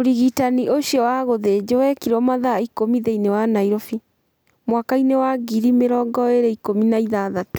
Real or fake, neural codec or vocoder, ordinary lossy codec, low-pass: real; none; none; none